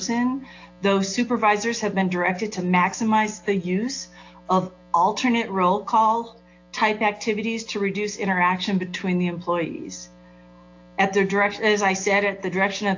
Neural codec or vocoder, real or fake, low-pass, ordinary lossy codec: none; real; 7.2 kHz; AAC, 48 kbps